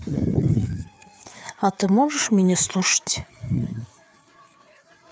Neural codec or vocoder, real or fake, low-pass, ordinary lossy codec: codec, 16 kHz, 4 kbps, FreqCodec, larger model; fake; none; none